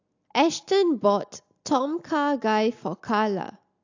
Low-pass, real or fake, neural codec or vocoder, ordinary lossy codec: 7.2 kHz; real; none; AAC, 48 kbps